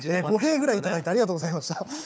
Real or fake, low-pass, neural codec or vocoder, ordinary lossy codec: fake; none; codec, 16 kHz, 4 kbps, FunCodec, trained on Chinese and English, 50 frames a second; none